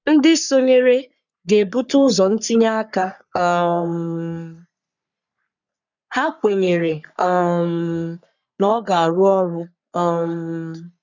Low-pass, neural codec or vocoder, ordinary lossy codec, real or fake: 7.2 kHz; codec, 44.1 kHz, 3.4 kbps, Pupu-Codec; none; fake